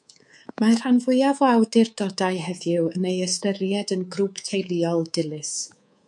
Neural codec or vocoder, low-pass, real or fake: codec, 24 kHz, 3.1 kbps, DualCodec; 10.8 kHz; fake